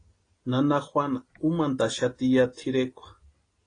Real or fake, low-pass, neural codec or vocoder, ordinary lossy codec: real; 9.9 kHz; none; AAC, 32 kbps